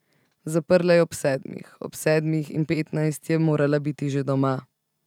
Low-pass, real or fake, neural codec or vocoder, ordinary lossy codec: 19.8 kHz; real; none; none